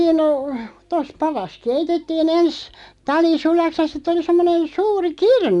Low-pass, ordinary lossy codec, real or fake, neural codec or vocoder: 10.8 kHz; none; real; none